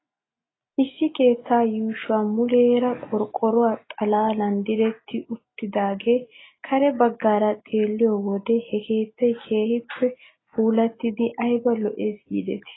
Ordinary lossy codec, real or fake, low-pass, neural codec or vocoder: AAC, 16 kbps; real; 7.2 kHz; none